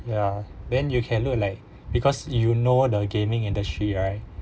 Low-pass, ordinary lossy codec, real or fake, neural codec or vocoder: none; none; real; none